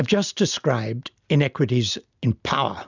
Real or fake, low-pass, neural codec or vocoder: real; 7.2 kHz; none